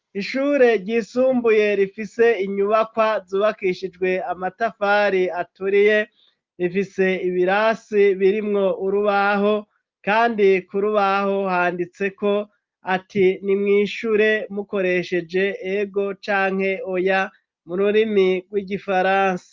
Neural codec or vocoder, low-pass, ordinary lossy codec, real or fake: none; 7.2 kHz; Opus, 24 kbps; real